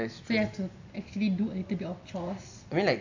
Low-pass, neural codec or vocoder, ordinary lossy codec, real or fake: 7.2 kHz; none; AAC, 48 kbps; real